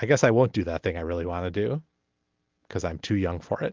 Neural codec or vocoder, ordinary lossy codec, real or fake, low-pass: none; Opus, 32 kbps; real; 7.2 kHz